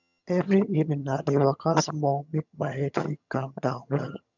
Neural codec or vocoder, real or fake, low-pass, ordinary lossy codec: vocoder, 22.05 kHz, 80 mel bands, HiFi-GAN; fake; 7.2 kHz; none